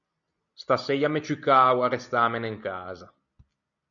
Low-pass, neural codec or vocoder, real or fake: 7.2 kHz; none; real